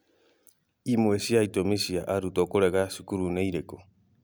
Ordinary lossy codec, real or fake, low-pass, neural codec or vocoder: none; real; none; none